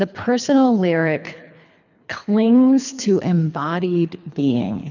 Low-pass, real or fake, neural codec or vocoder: 7.2 kHz; fake; codec, 24 kHz, 3 kbps, HILCodec